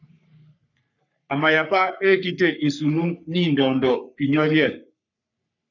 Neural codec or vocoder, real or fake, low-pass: codec, 44.1 kHz, 3.4 kbps, Pupu-Codec; fake; 7.2 kHz